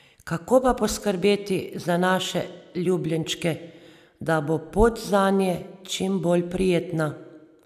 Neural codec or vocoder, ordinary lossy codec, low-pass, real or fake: none; none; 14.4 kHz; real